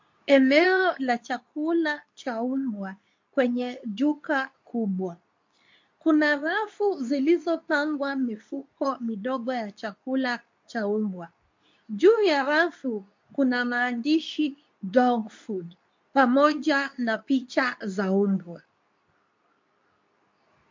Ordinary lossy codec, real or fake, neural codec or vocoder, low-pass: MP3, 48 kbps; fake; codec, 24 kHz, 0.9 kbps, WavTokenizer, medium speech release version 2; 7.2 kHz